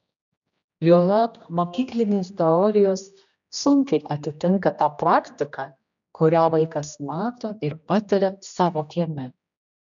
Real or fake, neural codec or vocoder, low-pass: fake; codec, 16 kHz, 1 kbps, X-Codec, HuBERT features, trained on general audio; 7.2 kHz